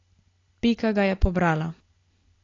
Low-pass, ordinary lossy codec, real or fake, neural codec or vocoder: 7.2 kHz; AAC, 32 kbps; real; none